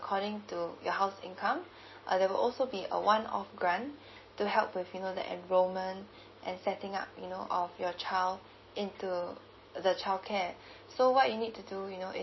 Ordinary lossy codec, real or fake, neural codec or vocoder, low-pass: MP3, 24 kbps; real; none; 7.2 kHz